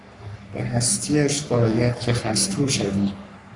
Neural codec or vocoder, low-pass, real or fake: codec, 44.1 kHz, 3.4 kbps, Pupu-Codec; 10.8 kHz; fake